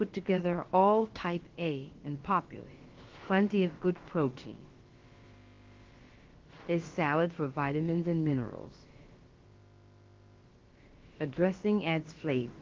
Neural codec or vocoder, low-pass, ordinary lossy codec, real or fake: codec, 16 kHz, about 1 kbps, DyCAST, with the encoder's durations; 7.2 kHz; Opus, 16 kbps; fake